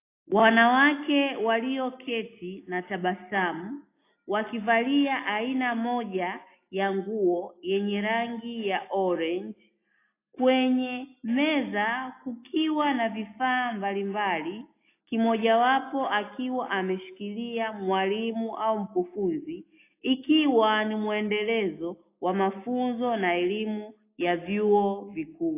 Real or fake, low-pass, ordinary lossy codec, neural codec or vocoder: real; 3.6 kHz; AAC, 24 kbps; none